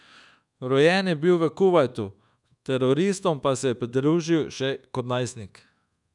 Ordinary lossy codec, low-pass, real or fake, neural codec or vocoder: none; 10.8 kHz; fake; codec, 24 kHz, 1.2 kbps, DualCodec